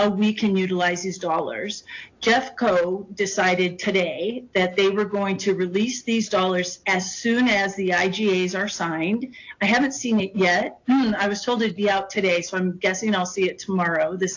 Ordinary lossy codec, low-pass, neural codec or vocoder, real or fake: AAC, 48 kbps; 7.2 kHz; none; real